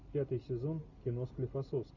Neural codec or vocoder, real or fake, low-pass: none; real; 7.2 kHz